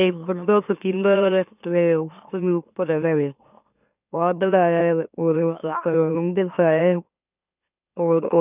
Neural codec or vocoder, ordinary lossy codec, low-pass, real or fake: autoencoder, 44.1 kHz, a latent of 192 numbers a frame, MeloTTS; none; 3.6 kHz; fake